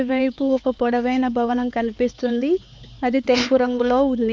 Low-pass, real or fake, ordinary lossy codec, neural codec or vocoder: none; fake; none; codec, 16 kHz, 4 kbps, X-Codec, HuBERT features, trained on LibriSpeech